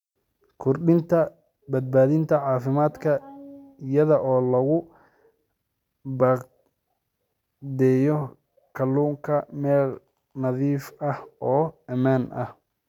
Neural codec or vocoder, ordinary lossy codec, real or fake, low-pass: none; none; real; 19.8 kHz